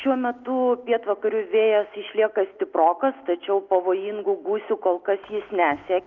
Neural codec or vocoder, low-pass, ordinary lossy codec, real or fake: none; 7.2 kHz; Opus, 24 kbps; real